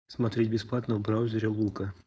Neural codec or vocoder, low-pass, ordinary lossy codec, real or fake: codec, 16 kHz, 4.8 kbps, FACodec; none; none; fake